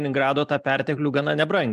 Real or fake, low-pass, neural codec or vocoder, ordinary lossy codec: real; 14.4 kHz; none; MP3, 96 kbps